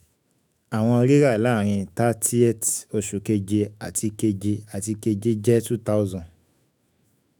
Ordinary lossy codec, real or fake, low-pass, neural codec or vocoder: none; fake; none; autoencoder, 48 kHz, 128 numbers a frame, DAC-VAE, trained on Japanese speech